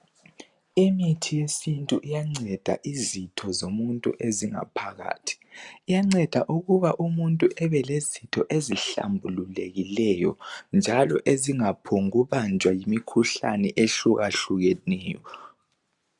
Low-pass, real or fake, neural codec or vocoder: 10.8 kHz; real; none